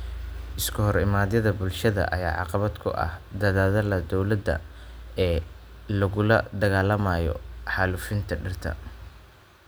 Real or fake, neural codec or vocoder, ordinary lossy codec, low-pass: real; none; none; none